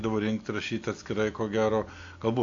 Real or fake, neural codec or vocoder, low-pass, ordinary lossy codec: real; none; 7.2 kHz; AAC, 48 kbps